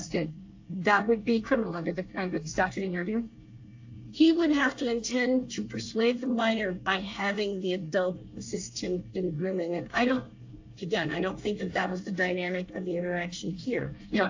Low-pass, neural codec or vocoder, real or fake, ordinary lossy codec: 7.2 kHz; codec, 24 kHz, 1 kbps, SNAC; fake; AAC, 48 kbps